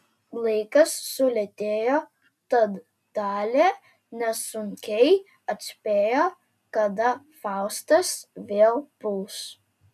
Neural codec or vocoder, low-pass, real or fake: none; 14.4 kHz; real